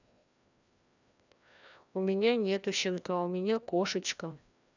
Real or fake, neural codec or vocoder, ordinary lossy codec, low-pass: fake; codec, 16 kHz, 1 kbps, FreqCodec, larger model; none; 7.2 kHz